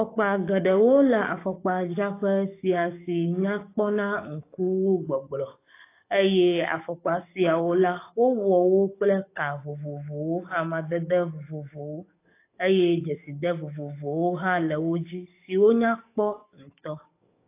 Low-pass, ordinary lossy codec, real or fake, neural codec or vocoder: 3.6 kHz; AAC, 24 kbps; fake; codec, 44.1 kHz, 7.8 kbps, DAC